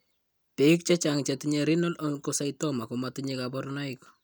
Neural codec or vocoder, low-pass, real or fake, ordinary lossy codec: none; none; real; none